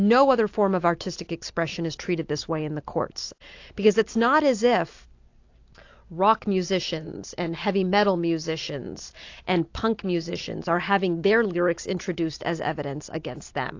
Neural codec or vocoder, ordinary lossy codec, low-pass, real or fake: none; AAC, 48 kbps; 7.2 kHz; real